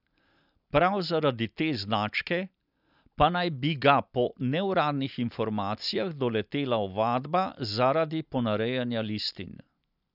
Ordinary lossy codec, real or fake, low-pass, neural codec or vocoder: none; real; 5.4 kHz; none